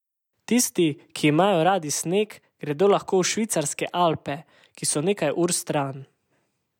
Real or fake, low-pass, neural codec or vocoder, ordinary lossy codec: real; 19.8 kHz; none; none